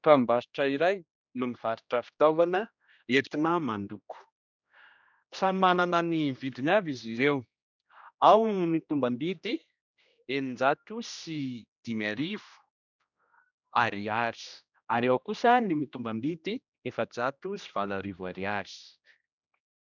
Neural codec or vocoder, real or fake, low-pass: codec, 16 kHz, 1 kbps, X-Codec, HuBERT features, trained on general audio; fake; 7.2 kHz